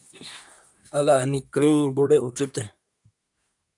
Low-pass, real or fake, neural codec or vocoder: 10.8 kHz; fake; codec, 24 kHz, 1 kbps, SNAC